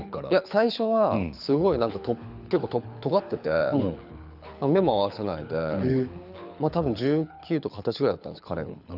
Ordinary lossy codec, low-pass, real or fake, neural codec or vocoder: none; 5.4 kHz; fake; codec, 24 kHz, 6 kbps, HILCodec